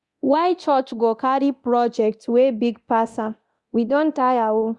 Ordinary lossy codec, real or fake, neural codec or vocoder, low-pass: Opus, 64 kbps; fake; codec, 24 kHz, 0.9 kbps, DualCodec; 10.8 kHz